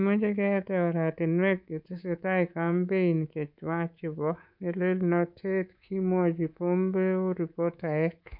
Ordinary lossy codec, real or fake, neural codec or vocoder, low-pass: none; fake; codec, 24 kHz, 3.1 kbps, DualCodec; 5.4 kHz